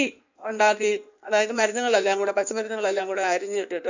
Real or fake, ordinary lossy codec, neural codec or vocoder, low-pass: fake; none; codec, 16 kHz in and 24 kHz out, 1.1 kbps, FireRedTTS-2 codec; 7.2 kHz